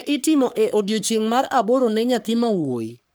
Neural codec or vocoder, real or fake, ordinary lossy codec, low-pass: codec, 44.1 kHz, 3.4 kbps, Pupu-Codec; fake; none; none